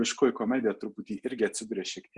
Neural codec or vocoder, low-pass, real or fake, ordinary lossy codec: none; 10.8 kHz; real; Opus, 64 kbps